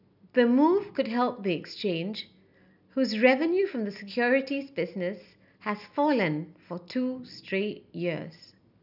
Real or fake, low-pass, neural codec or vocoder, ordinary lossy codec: real; 5.4 kHz; none; none